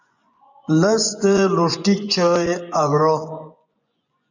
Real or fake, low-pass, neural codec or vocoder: fake; 7.2 kHz; vocoder, 24 kHz, 100 mel bands, Vocos